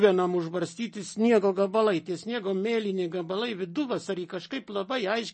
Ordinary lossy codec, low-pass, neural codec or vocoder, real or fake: MP3, 32 kbps; 10.8 kHz; none; real